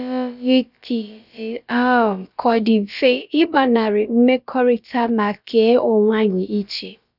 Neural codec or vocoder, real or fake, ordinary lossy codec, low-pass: codec, 16 kHz, about 1 kbps, DyCAST, with the encoder's durations; fake; none; 5.4 kHz